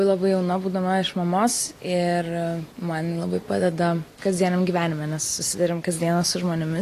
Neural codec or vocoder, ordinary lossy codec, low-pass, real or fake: none; AAC, 48 kbps; 14.4 kHz; real